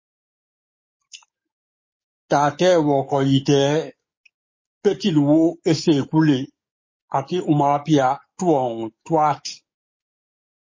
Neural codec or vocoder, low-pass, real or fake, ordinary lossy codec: codec, 44.1 kHz, 7.8 kbps, DAC; 7.2 kHz; fake; MP3, 32 kbps